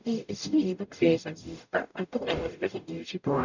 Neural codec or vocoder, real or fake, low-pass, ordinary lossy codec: codec, 44.1 kHz, 0.9 kbps, DAC; fake; 7.2 kHz; none